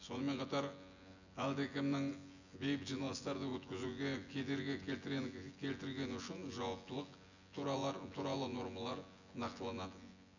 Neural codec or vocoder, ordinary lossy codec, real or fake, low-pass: vocoder, 24 kHz, 100 mel bands, Vocos; none; fake; 7.2 kHz